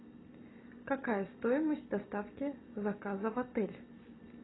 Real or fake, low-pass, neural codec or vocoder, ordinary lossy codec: real; 7.2 kHz; none; AAC, 16 kbps